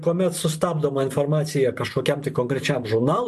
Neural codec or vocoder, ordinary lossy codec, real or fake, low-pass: vocoder, 44.1 kHz, 128 mel bands every 512 samples, BigVGAN v2; MP3, 96 kbps; fake; 14.4 kHz